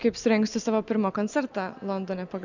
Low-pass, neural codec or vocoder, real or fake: 7.2 kHz; none; real